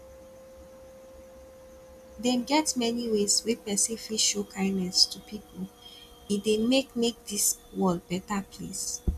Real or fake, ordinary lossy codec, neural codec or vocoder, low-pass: real; none; none; 14.4 kHz